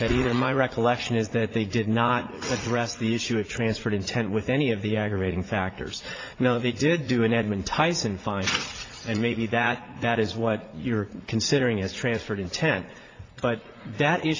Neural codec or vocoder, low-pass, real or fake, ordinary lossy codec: vocoder, 44.1 kHz, 80 mel bands, Vocos; 7.2 kHz; fake; AAC, 32 kbps